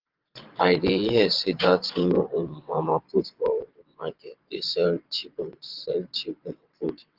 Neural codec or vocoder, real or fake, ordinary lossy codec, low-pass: vocoder, 22.05 kHz, 80 mel bands, WaveNeXt; fake; Opus, 32 kbps; 5.4 kHz